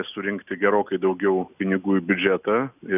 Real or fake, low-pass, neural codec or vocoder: real; 3.6 kHz; none